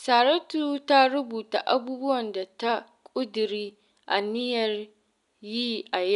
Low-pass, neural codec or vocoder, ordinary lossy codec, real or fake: 10.8 kHz; none; none; real